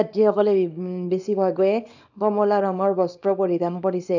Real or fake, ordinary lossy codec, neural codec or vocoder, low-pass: fake; none; codec, 24 kHz, 0.9 kbps, WavTokenizer, small release; 7.2 kHz